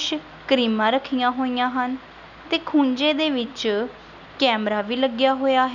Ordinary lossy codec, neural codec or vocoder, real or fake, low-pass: none; none; real; 7.2 kHz